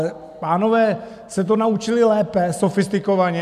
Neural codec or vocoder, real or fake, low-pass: none; real; 14.4 kHz